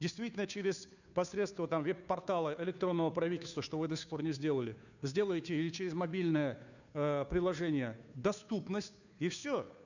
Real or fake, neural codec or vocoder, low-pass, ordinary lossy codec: fake; codec, 16 kHz, 2 kbps, FunCodec, trained on Chinese and English, 25 frames a second; 7.2 kHz; none